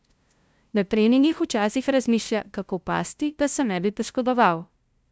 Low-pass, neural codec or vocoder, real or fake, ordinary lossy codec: none; codec, 16 kHz, 0.5 kbps, FunCodec, trained on LibriTTS, 25 frames a second; fake; none